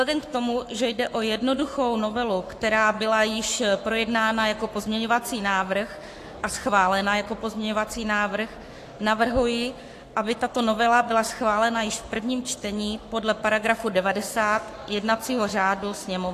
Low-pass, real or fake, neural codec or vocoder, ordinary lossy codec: 14.4 kHz; fake; codec, 44.1 kHz, 7.8 kbps, Pupu-Codec; AAC, 64 kbps